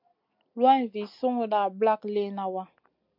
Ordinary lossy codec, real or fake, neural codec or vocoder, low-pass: MP3, 48 kbps; real; none; 5.4 kHz